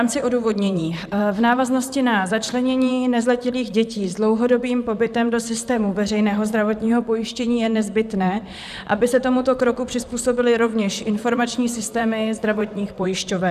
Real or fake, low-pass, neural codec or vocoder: fake; 14.4 kHz; vocoder, 44.1 kHz, 128 mel bands, Pupu-Vocoder